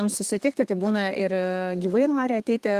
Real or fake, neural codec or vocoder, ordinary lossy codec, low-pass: fake; codec, 32 kHz, 1.9 kbps, SNAC; Opus, 32 kbps; 14.4 kHz